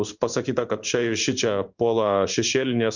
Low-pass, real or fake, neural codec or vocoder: 7.2 kHz; fake; codec, 16 kHz in and 24 kHz out, 1 kbps, XY-Tokenizer